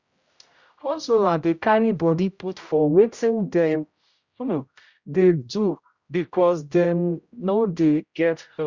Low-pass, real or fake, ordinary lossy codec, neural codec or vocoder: 7.2 kHz; fake; Opus, 64 kbps; codec, 16 kHz, 0.5 kbps, X-Codec, HuBERT features, trained on general audio